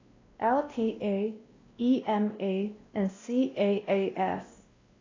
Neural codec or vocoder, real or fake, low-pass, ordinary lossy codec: codec, 16 kHz, 1 kbps, X-Codec, WavLM features, trained on Multilingual LibriSpeech; fake; 7.2 kHz; AAC, 32 kbps